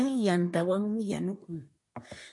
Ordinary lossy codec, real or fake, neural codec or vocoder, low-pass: MP3, 48 kbps; fake; codec, 24 kHz, 1 kbps, SNAC; 10.8 kHz